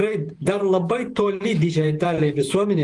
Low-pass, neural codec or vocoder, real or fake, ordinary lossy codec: 10.8 kHz; vocoder, 48 kHz, 128 mel bands, Vocos; fake; Opus, 24 kbps